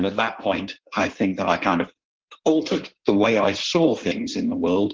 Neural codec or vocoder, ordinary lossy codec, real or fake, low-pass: codec, 16 kHz in and 24 kHz out, 1.1 kbps, FireRedTTS-2 codec; Opus, 16 kbps; fake; 7.2 kHz